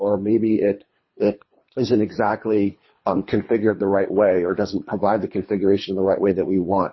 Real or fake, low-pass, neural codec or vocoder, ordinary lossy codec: fake; 7.2 kHz; codec, 24 kHz, 3 kbps, HILCodec; MP3, 24 kbps